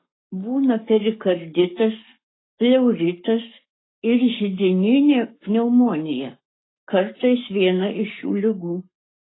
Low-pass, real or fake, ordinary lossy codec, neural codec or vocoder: 7.2 kHz; fake; AAC, 16 kbps; codec, 44.1 kHz, 7.8 kbps, Pupu-Codec